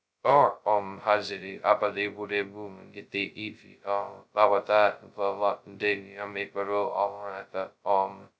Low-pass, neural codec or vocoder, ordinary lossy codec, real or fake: none; codec, 16 kHz, 0.2 kbps, FocalCodec; none; fake